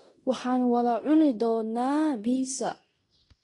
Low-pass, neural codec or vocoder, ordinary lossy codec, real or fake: 10.8 kHz; codec, 16 kHz in and 24 kHz out, 0.9 kbps, LongCat-Audio-Codec, fine tuned four codebook decoder; AAC, 32 kbps; fake